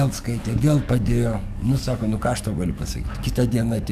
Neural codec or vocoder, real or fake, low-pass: codec, 44.1 kHz, 7.8 kbps, DAC; fake; 14.4 kHz